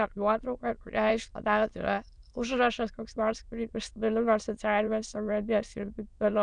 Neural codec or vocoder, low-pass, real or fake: autoencoder, 22.05 kHz, a latent of 192 numbers a frame, VITS, trained on many speakers; 9.9 kHz; fake